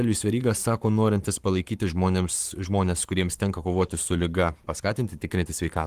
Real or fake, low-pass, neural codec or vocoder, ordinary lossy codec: fake; 14.4 kHz; codec, 44.1 kHz, 7.8 kbps, Pupu-Codec; Opus, 24 kbps